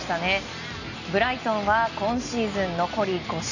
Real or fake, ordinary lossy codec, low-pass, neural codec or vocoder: real; none; 7.2 kHz; none